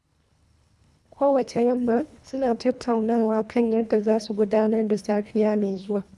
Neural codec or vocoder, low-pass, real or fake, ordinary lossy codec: codec, 24 kHz, 1.5 kbps, HILCodec; none; fake; none